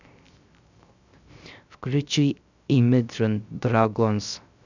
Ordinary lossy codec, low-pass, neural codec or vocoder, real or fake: none; 7.2 kHz; codec, 16 kHz, 0.3 kbps, FocalCodec; fake